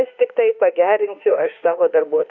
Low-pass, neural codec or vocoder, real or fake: 7.2 kHz; autoencoder, 48 kHz, 32 numbers a frame, DAC-VAE, trained on Japanese speech; fake